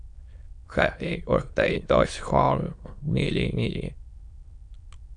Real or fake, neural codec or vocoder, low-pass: fake; autoencoder, 22.05 kHz, a latent of 192 numbers a frame, VITS, trained on many speakers; 9.9 kHz